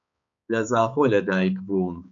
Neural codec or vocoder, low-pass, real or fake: codec, 16 kHz, 4 kbps, X-Codec, HuBERT features, trained on balanced general audio; 7.2 kHz; fake